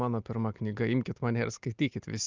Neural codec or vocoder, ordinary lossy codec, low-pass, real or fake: none; Opus, 24 kbps; 7.2 kHz; real